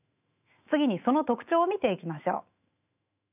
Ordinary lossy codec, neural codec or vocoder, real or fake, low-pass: none; autoencoder, 48 kHz, 128 numbers a frame, DAC-VAE, trained on Japanese speech; fake; 3.6 kHz